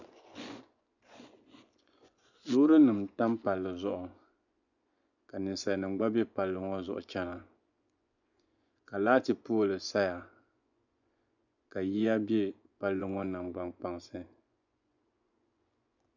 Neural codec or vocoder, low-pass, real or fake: vocoder, 24 kHz, 100 mel bands, Vocos; 7.2 kHz; fake